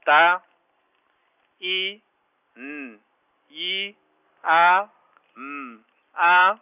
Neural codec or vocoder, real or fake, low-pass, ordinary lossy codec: none; real; 3.6 kHz; none